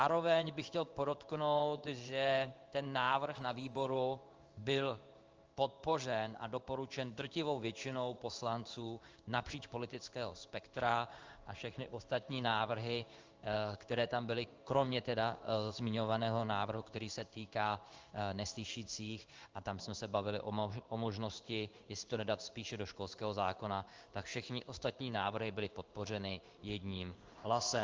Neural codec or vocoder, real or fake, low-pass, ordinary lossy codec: codec, 16 kHz in and 24 kHz out, 1 kbps, XY-Tokenizer; fake; 7.2 kHz; Opus, 24 kbps